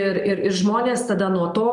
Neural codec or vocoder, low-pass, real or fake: none; 10.8 kHz; real